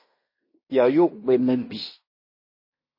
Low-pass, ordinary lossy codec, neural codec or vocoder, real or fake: 5.4 kHz; MP3, 24 kbps; codec, 16 kHz in and 24 kHz out, 0.9 kbps, LongCat-Audio-Codec, fine tuned four codebook decoder; fake